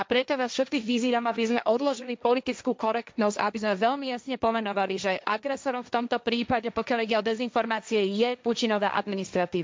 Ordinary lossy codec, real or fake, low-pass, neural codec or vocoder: none; fake; none; codec, 16 kHz, 1.1 kbps, Voila-Tokenizer